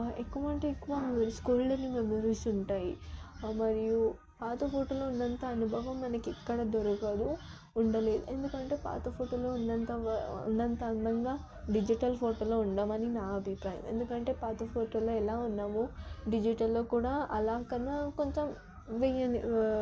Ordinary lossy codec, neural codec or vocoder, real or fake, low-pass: none; none; real; none